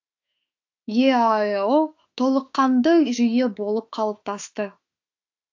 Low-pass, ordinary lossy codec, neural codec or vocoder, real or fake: 7.2 kHz; AAC, 48 kbps; autoencoder, 48 kHz, 32 numbers a frame, DAC-VAE, trained on Japanese speech; fake